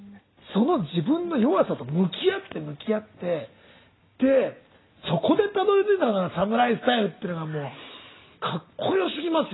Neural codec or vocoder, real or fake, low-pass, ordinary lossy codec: none; real; 7.2 kHz; AAC, 16 kbps